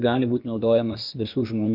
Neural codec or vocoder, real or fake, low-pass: codec, 16 kHz, 0.8 kbps, ZipCodec; fake; 5.4 kHz